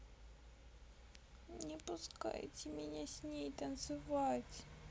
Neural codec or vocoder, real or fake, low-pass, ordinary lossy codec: none; real; none; none